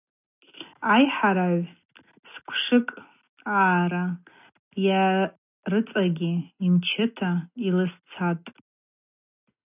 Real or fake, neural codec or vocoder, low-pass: real; none; 3.6 kHz